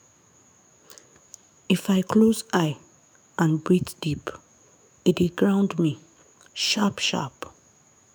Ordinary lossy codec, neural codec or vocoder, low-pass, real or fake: none; autoencoder, 48 kHz, 128 numbers a frame, DAC-VAE, trained on Japanese speech; none; fake